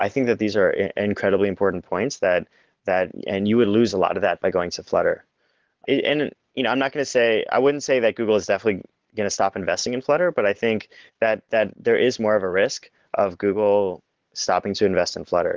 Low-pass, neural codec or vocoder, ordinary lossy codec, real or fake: 7.2 kHz; none; Opus, 16 kbps; real